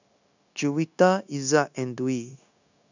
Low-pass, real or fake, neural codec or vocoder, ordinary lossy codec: 7.2 kHz; fake; codec, 16 kHz, 0.9 kbps, LongCat-Audio-Codec; none